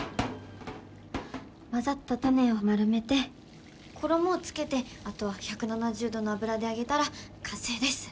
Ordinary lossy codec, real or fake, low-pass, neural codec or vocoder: none; real; none; none